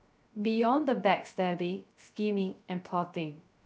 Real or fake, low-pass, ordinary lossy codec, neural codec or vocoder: fake; none; none; codec, 16 kHz, 0.3 kbps, FocalCodec